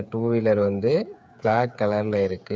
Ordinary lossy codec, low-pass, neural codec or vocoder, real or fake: none; none; codec, 16 kHz, 8 kbps, FreqCodec, smaller model; fake